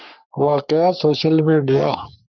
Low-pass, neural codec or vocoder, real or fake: 7.2 kHz; codec, 44.1 kHz, 3.4 kbps, Pupu-Codec; fake